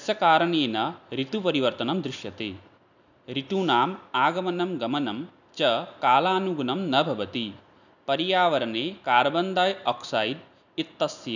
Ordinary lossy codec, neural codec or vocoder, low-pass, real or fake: none; none; 7.2 kHz; real